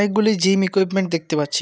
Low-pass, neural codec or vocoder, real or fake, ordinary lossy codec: none; none; real; none